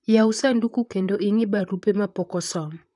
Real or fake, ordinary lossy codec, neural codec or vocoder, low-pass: fake; none; vocoder, 44.1 kHz, 128 mel bands, Pupu-Vocoder; 10.8 kHz